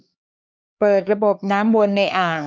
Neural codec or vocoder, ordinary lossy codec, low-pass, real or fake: codec, 16 kHz, 2 kbps, X-Codec, HuBERT features, trained on LibriSpeech; none; none; fake